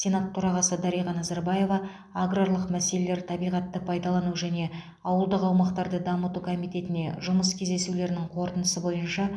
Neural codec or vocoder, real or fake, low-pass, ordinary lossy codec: none; real; none; none